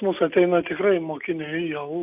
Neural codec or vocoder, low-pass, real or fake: none; 3.6 kHz; real